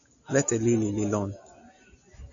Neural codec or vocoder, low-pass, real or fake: none; 7.2 kHz; real